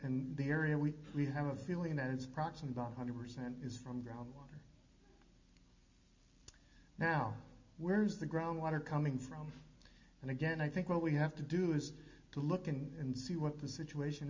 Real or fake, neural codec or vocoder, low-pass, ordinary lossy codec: real; none; 7.2 kHz; MP3, 48 kbps